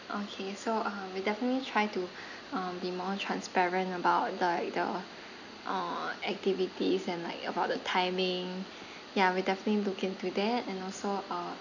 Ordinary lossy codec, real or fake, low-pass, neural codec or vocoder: none; real; 7.2 kHz; none